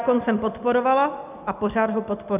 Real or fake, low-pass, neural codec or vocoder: real; 3.6 kHz; none